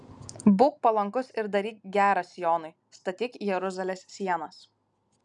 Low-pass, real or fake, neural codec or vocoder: 10.8 kHz; real; none